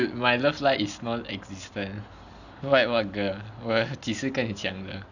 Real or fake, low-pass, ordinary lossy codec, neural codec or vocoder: real; 7.2 kHz; none; none